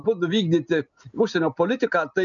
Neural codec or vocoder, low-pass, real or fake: none; 7.2 kHz; real